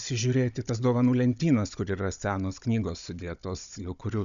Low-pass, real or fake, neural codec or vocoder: 7.2 kHz; fake; codec, 16 kHz, 16 kbps, FunCodec, trained on Chinese and English, 50 frames a second